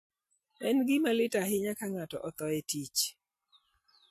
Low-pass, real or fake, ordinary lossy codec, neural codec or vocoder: 14.4 kHz; real; MP3, 64 kbps; none